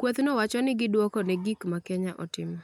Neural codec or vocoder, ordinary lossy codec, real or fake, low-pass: none; MP3, 96 kbps; real; 19.8 kHz